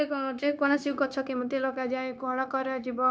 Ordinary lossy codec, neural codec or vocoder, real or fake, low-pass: none; codec, 16 kHz, 0.9 kbps, LongCat-Audio-Codec; fake; none